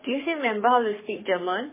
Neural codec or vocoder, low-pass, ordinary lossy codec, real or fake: codec, 44.1 kHz, 3.4 kbps, Pupu-Codec; 3.6 kHz; MP3, 16 kbps; fake